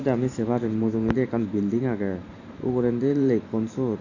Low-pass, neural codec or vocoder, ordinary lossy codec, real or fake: 7.2 kHz; vocoder, 44.1 kHz, 128 mel bands every 512 samples, BigVGAN v2; none; fake